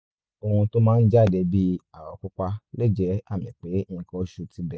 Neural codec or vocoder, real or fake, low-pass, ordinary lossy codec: none; real; none; none